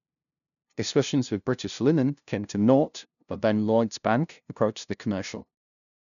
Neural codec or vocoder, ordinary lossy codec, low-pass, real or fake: codec, 16 kHz, 0.5 kbps, FunCodec, trained on LibriTTS, 25 frames a second; none; 7.2 kHz; fake